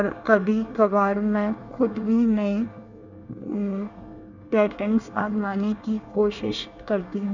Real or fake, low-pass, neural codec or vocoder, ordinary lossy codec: fake; 7.2 kHz; codec, 24 kHz, 1 kbps, SNAC; none